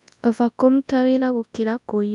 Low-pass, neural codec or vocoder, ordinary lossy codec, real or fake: 10.8 kHz; codec, 24 kHz, 0.9 kbps, WavTokenizer, large speech release; none; fake